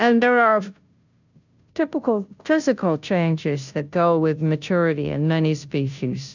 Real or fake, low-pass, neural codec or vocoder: fake; 7.2 kHz; codec, 16 kHz, 0.5 kbps, FunCodec, trained on Chinese and English, 25 frames a second